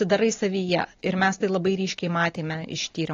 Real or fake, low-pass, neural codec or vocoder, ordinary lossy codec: real; 7.2 kHz; none; AAC, 32 kbps